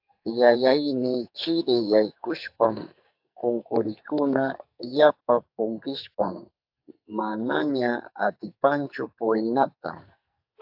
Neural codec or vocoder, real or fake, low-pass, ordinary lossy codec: codec, 44.1 kHz, 2.6 kbps, SNAC; fake; 5.4 kHz; AAC, 48 kbps